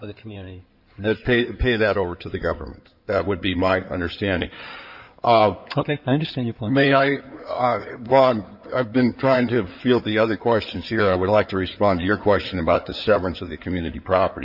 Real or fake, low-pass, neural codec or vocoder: fake; 5.4 kHz; codec, 16 kHz in and 24 kHz out, 2.2 kbps, FireRedTTS-2 codec